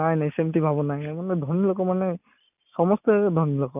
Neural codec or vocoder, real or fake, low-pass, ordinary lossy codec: none; real; 3.6 kHz; none